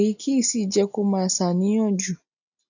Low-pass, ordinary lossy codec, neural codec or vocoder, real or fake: 7.2 kHz; none; none; real